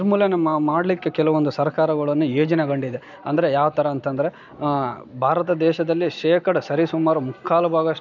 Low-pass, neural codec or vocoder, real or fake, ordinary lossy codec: 7.2 kHz; none; real; none